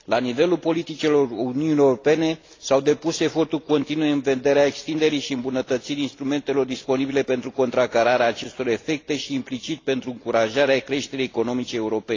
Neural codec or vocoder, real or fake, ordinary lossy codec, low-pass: none; real; AAC, 32 kbps; 7.2 kHz